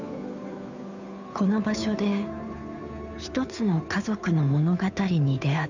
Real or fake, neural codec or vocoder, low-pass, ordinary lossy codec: fake; codec, 16 kHz, 2 kbps, FunCodec, trained on Chinese and English, 25 frames a second; 7.2 kHz; none